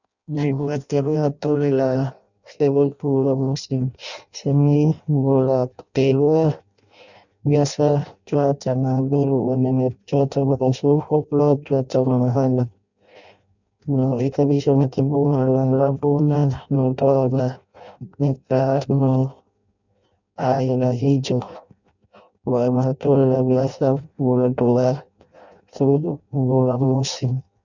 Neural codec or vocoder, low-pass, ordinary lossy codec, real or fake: codec, 16 kHz in and 24 kHz out, 0.6 kbps, FireRedTTS-2 codec; 7.2 kHz; none; fake